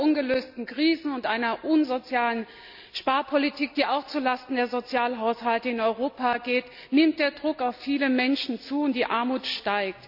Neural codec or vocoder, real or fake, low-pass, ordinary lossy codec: none; real; 5.4 kHz; none